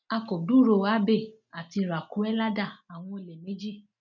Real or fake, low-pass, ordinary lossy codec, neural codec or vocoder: real; 7.2 kHz; none; none